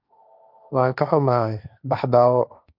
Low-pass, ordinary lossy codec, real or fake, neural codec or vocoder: 5.4 kHz; none; fake; codec, 16 kHz, 1.1 kbps, Voila-Tokenizer